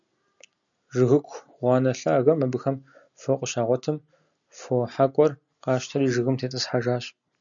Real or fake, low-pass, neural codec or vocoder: real; 7.2 kHz; none